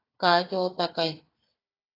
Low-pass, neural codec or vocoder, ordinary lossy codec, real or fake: 5.4 kHz; codec, 16 kHz, 4 kbps, FunCodec, trained on Chinese and English, 50 frames a second; AAC, 24 kbps; fake